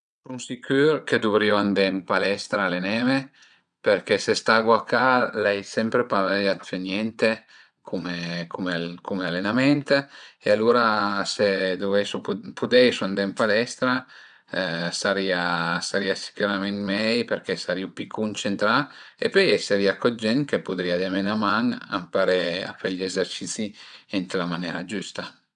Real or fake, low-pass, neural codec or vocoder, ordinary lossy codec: fake; 9.9 kHz; vocoder, 22.05 kHz, 80 mel bands, WaveNeXt; none